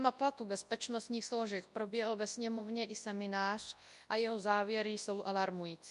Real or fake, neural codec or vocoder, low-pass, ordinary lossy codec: fake; codec, 24 kHz, 0.9 kbps, WavTokenizer, large speech release; 10.8 kHz; MP3, 64 kbps